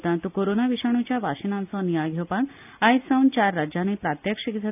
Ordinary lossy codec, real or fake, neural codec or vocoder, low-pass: none; real; none; 3.6 kHz